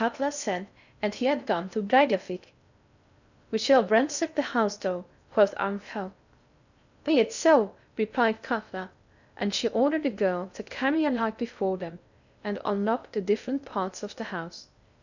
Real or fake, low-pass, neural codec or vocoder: fake; 7.2 kHz; codec, 16 kHz in and 24 kHz out, 0.6 kbps, FocalCodec, streaming, 4096 codes